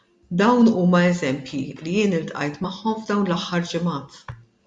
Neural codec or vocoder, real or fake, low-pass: none; real; 10.8 kHz